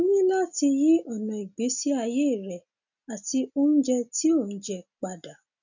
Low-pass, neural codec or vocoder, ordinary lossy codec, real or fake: 7.2 kHz; none; none; real